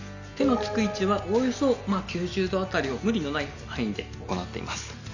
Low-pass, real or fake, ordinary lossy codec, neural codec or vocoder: 7.2 kHz; real; none; none